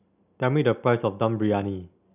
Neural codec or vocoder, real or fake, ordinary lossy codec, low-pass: none; real; none; 3.6 kHz